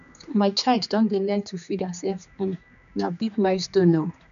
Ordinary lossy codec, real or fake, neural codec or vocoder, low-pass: none; fake; codec, 16 kHz, 2 kbps, X-Codec, HuBERT features, trained on general audio; 7.2 kHz